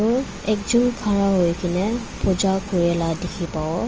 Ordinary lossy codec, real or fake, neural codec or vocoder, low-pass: Opus, 24 kbps; real; none; 7.2 kHz